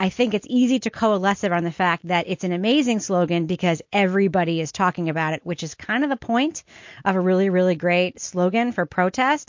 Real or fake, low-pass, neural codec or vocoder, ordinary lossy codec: real; 7.2 kHz; none; MP3, 48 kbps